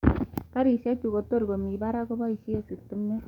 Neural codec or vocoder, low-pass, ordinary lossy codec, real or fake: codec, 44.1 kHz, 7.8 kbps, DAC; 19.8 kHz; none; fake